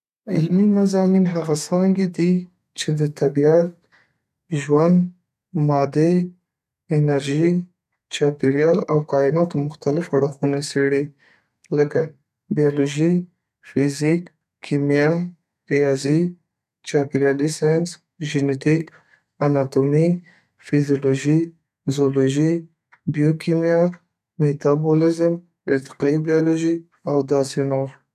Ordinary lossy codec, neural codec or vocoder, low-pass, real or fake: none; codec, 32 kHz, 1.9 kbps, SNAC; 14.4 kHz; fake